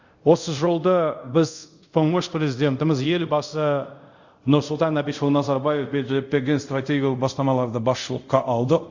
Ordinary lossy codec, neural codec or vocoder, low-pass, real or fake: Opus, 64 kbps; codec, 24 kHz, 0.5 kbps, DualCodec; 7.2 kHz; fake